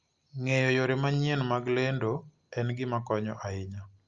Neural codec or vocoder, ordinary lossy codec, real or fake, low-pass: none; Opus, 32 kbps; real; 7.2 kHz